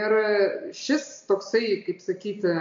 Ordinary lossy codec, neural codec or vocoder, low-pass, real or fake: MP3, 48 kbps; none; 7.2 kHz; real